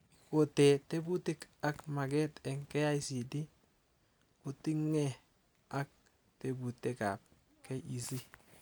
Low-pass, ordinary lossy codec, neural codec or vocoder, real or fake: none; none; none; real